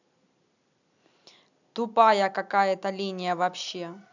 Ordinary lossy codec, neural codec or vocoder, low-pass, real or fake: none; none; 7.2 kHz; real